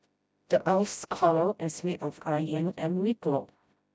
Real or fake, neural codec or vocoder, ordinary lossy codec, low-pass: fake; codec, 16 kHz, 0.5 kbps, FreqCodec, smaller model; none; none